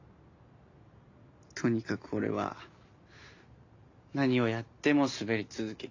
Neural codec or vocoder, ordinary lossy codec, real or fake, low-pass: none; AAC, 48 kbps; real; 7.2 kHz